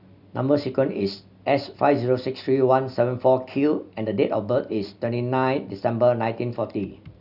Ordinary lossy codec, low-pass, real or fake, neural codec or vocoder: none; 5.4 kHz; real; none